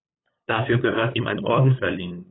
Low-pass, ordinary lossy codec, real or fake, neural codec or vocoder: 7.2 kHz; AAC, 16 kbps; fake; codec, 16 kHz, 8 kbps, FunCodec, trained on LibriTTS, 25 frames a second